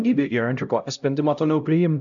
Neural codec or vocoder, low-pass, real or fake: codec, 16 kHz, 0.5 kbps, X-Codec, HuBERT features, trained on LibriSpeech; 7.2 kHz; fake